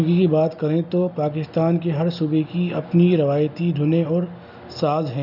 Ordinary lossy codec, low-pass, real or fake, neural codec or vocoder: none; 5.4 kHz; real; none